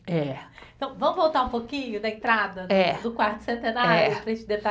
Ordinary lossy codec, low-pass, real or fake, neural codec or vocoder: none; none; real; none